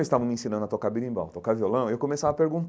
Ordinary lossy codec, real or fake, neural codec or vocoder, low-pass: none; real; none; none